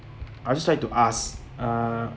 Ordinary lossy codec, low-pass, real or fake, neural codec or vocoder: none; none; real; none